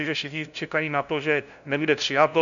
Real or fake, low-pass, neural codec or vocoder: fake; 7.2 kHz; codec, 16 kHz, 0.5 kbps, FunCodec, trained on LibriTTS, 25 frames a second